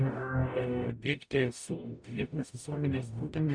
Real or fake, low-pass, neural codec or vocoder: fake; 9.9 kHz; codec, 44.1 kHz, 0.9 kbps, DAC